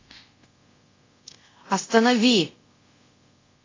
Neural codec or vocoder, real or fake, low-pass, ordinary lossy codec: codec, 24 kHz, 0.5 kbps, DualCodec; fake; 7.2 kHz; AAC, 32 kbps